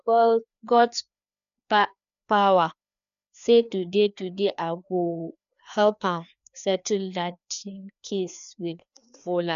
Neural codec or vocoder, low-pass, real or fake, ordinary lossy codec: codec, 16 kHz, 2 kbps, FreqCodec, larger model; 7.2 kHz; fake; none